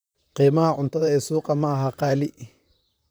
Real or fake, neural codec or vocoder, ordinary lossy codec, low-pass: fake; vocoder, 44.1 kHz, 128 mel bands, Pupu-Vocoder; none; none